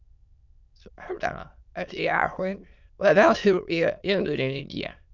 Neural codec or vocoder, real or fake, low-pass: autoencoder, 22.05 kHz, a latent of 192 numbers a frame, VITS, trained on many speakers; fake; 7.2 kHz